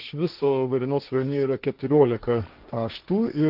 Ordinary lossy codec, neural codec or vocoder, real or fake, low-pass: Opus, 32 kbps; codec, 16 kHz, 1.1 kbps, Voila-Tokenizer; fake; 5.4 kHz